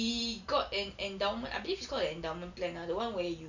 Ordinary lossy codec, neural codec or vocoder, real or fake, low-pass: none; none; real; 7.2 kHz